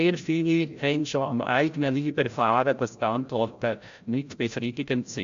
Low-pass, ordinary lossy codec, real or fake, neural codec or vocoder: 7.2 kHz; AAC, 64 kbps; fake; codec, 16 kHz, 0.5 kbps, FreqCodec, larger model